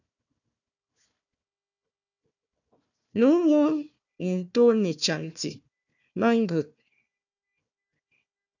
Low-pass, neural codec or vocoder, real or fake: 7.2 kHz; codec, 16 kHz, 1 kbps, FunCodec, trained on Chinese and English, 50 frames a second; fake